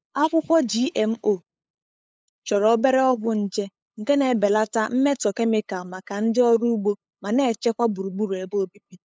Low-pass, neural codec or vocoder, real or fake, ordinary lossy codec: none; codec, 16 kHz, 8 kbps, FunCodec, trained on LibriTTS, 25 frames a second; fake; none